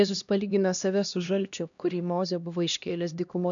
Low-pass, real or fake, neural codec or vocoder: 7.2 kHz; fake; codec, 16 kHz, 1 kbps, X-Codec, HuBERT features, trained on LibriSpeech